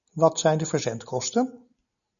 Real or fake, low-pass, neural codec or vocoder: real; 7.2 kHz; none